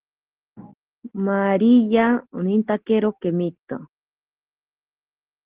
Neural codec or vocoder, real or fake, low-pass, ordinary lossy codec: none; real; 3.6 kHz; Opus, 16 kbps